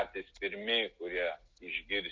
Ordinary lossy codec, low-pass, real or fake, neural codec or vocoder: Opus, 32 kbps; 7.2 kHz; real; none